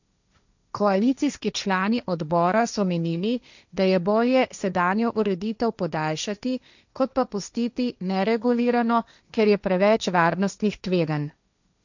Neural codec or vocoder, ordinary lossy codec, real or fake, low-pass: codec, 16 kHz, 1.1 kbps, Voila-Tokenizer; none; fake; 7.2 kHz